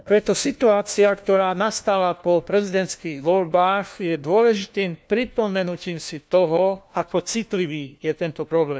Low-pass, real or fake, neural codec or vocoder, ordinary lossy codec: none; fake; codec, 16 kHz, 1 kbps, FunCodec, trained on LibriTTS, 50 frames a second; none